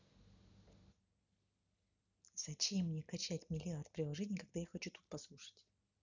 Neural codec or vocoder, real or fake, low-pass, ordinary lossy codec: none; real; 7.2 kHz; none